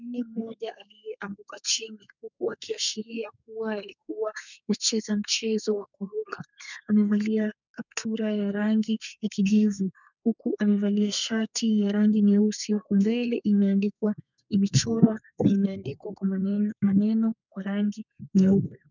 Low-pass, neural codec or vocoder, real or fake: 7.2 kHz; codec, 32 kHz, 1.9 kbps, SNAC; fake